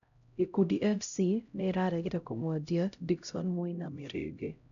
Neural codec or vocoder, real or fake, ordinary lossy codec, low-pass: codec, 16 kHz, 0.5 kbps, X-Codec, HuBERT features, trained on LibriSpeech; fake; none; 7.2 kHz